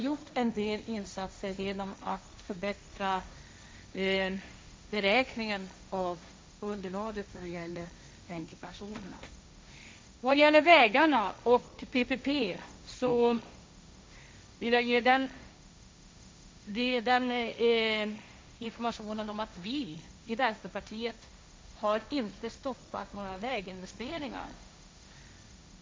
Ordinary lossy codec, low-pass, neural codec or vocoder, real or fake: none; none; codec, 16 kHz, 1.1 kbps, Voila-Tokenizer; fake